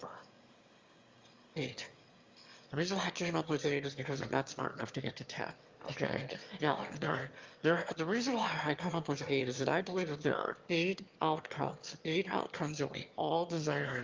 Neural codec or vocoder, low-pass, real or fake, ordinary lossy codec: autoencoder, 22.05 kHz, a latent of 192 numbers a frame, VITS, trained on one speaker; 7.2 kHz; fake; Opus, 32 kbps